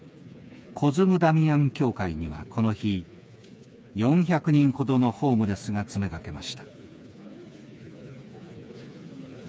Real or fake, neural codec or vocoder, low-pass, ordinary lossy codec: fake; codec, 16 kHz, 4 kbps, FreqCodec, smaller model; none; none